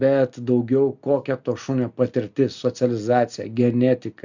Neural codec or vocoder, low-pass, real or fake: none; 7.2 kHz; real